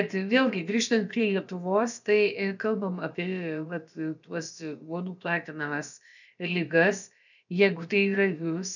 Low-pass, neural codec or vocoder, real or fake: 7.2 kHz; codec, 16 kHz, about 1 kbps, DyCAST, with the encoder's durations; fake